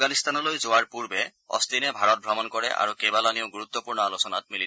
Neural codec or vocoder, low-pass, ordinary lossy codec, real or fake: none; none; none; real